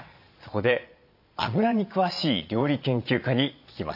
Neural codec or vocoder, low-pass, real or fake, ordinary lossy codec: vocoder, 22.05 kHz, 80 mel bands, Vocos; 5.4 kHz; fake; AAC, 48 kbps